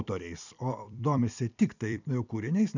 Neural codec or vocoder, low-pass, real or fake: vocoder, 44.1 kHz, 128 mel bands every 256 samples, BigVGAN v2; 7.2 kHz; fake